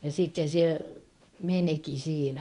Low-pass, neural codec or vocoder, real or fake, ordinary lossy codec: 10.8 kHz; codec, 24 kHz, 0.9 kbps, WavTokenizer, medium speech release version 1; fake; MP3, 96 kbps